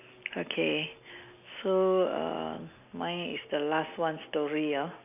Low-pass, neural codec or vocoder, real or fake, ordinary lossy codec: 3.6 kHz; none; real; none